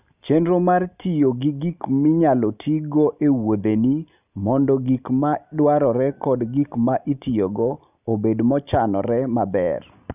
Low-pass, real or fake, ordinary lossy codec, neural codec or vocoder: 3.6 kHz; real; none; none